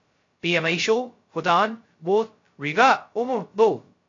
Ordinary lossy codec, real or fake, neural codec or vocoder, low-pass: AAC, 48 kbps; fake; codec, 16 kHz, 0.2 kbps, FocalCodec; 7.2 kHz